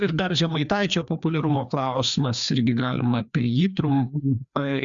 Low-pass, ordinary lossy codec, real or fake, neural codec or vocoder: 7.2 kHz; Opus, 64 kbps; fake; codec, 16 kHz, 2 kbps, FreqCodec, larger model